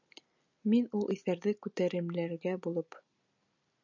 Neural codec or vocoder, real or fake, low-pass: none; real; 7.2 kHz